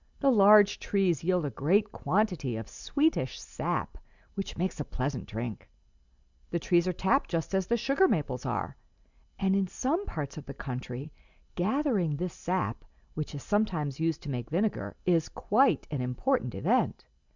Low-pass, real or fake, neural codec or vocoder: 7.2 kHz; real; none